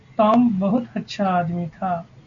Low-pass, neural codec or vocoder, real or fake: 7.2 kHz; none; real